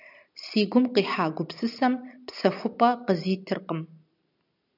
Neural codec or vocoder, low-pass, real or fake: none; 5.4 kHz; real